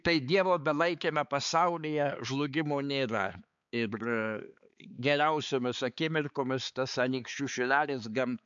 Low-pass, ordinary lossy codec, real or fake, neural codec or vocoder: 7.2 kHz; MP3, 64 kbps; fake; codec, 16 kHz, 4 kbps, X-Codec, HuBERT features, trained on balanced general audio